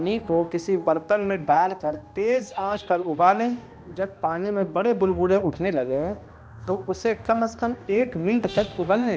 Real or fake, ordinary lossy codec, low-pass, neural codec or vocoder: fake; none; none; codec, 16 kHz, 1 kbps, X-Codec, HuBERT features, trained on balanced general audio